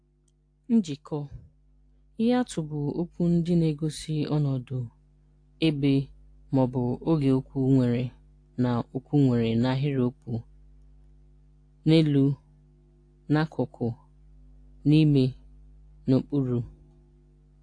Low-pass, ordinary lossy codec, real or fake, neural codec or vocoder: 9.9 kHz; AAC, 48 kbps; real; none